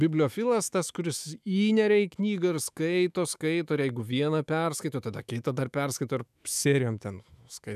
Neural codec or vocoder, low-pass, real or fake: autoencoder, 48 kHz, 128 numbers a frame, DAC-VAE, trained on Japanese speech; 14.4 kHz; fake